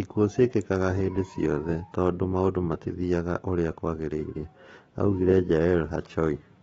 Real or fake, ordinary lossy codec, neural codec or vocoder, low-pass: fake; AAC, 24 kbps; autoencoder, 48 kHz, 128 numbers a frame, DAC-VAE, trained on Japanese speech; 19.8 kHz